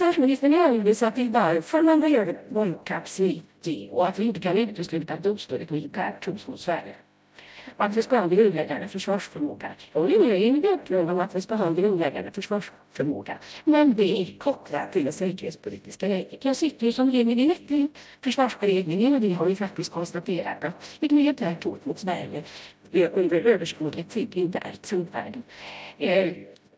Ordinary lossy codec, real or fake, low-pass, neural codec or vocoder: none; fake; none; codec, 16 kHz, 0.5 kbps, FreqCodec, smaller model